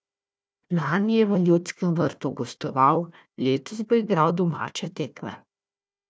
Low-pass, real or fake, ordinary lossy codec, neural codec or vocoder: none; fake; none; codec, 16 kHz, 1 kbps, FunCodec, trained on Chinese and English, 50 frames a second